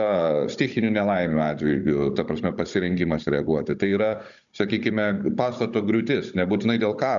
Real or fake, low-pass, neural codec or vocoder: fake; 7.2 kHz; codec, 16 kHz, 16 kbps, FunCodec, trained on Chinese and English, 50 frames a second